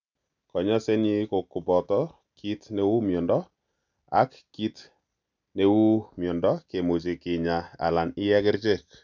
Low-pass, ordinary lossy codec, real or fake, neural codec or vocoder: 7.2 kHz; none; real; none